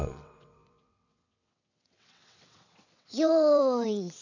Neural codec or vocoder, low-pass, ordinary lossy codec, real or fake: vocoder, 22.05 kHz, 80 mel bands, WaveNeXt; 7.2 kHz; none; fake